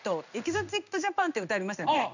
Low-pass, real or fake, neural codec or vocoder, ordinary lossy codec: 7.2 kHz; fake; codec, 16 kHz in and 24 kHz out, 1 kbps, XY-Tokenizer; none